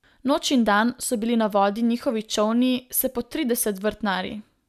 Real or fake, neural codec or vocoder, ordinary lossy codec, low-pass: real; none; none; 14.4 kHz